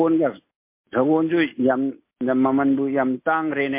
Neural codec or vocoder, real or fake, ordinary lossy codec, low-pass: none; real; MP3, 24 kbps; 3.6 kHz